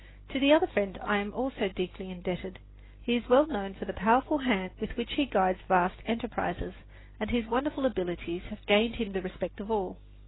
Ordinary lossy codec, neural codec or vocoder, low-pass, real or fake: AAC, 16 kbps; none; 7.2 kHz; real